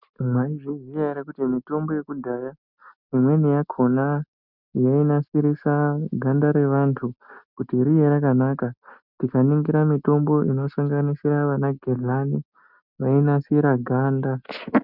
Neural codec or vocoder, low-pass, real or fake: none; 5.4 kHz; real